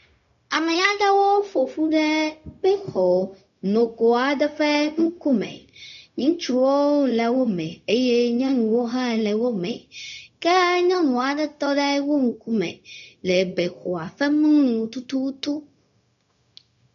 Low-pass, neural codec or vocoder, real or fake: 7.2 kHz; codec, 16 kHz, 0.4 kbps, LongCat-Audio-Codec; fake